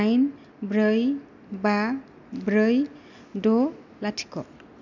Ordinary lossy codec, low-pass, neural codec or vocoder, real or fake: none; 7.2 kHz; none; real